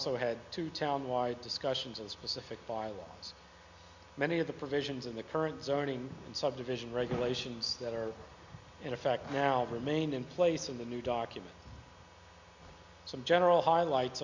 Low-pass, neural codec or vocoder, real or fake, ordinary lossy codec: 7.2 kHz; none; real; Opus, 64 kbps